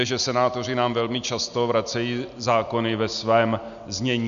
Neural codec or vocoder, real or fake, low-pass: none; real; 7.2 kHz